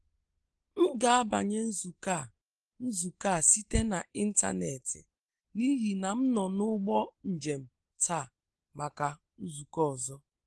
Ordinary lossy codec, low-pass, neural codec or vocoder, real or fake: Opus, 16 kbps; 10.8 kHz; none; real